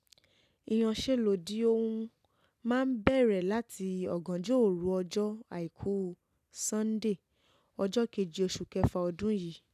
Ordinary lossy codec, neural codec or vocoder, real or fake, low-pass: none; none; real; 14.4 kHz